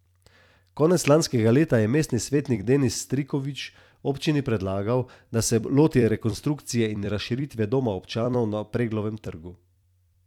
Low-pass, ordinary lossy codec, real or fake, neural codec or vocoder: 19.8 kHz; none; fake; vocoder, 44.1 kHz, 128 mel bands every 256 samples, BigVGAN v2